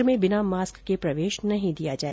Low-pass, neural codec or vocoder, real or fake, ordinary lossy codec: none; none; real; none